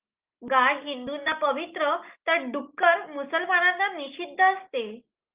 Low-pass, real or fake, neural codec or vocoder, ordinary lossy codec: 3.6 kHz; real; none; Opus, 32 kbps